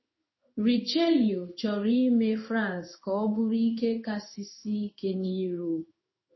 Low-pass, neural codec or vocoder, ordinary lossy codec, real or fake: 7.2 kHz; codec, 16 kHz in and 24 kHz out, 1 kbps, XY-Tokenizer; MP3, 24 kbps; fake